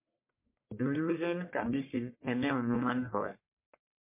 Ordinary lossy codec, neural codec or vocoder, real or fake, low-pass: MP3, 24 kbps; codec, 44.1 kHz, 1.7 kbps, Pupu-Codec; fake; 3.6 kHz